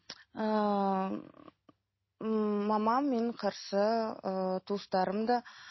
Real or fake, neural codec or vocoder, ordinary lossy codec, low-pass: real; none; MP3, 24 kbps; 7.2 kHz